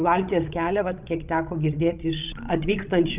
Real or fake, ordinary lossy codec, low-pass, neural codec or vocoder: fake; Opus, 32 kbps; 3.6 kHz; codec, 16 kHz, 16 kbps, FreqCodec, larger model